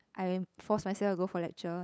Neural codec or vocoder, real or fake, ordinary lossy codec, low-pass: codec, 16 kHz, 8 kbps, FunCodec, trained on LibriTTS, 25 frames a second; fake; none; none